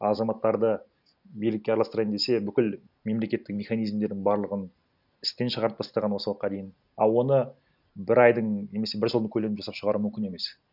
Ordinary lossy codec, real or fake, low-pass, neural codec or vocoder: none; real; 5.4 kHz; none